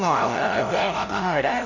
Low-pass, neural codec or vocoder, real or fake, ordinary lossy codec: 7.2 kHz; codec, 16 kHz, 0.5 kbps, FunCodec, trained on LibriTTS, 25 frames a second; fake; none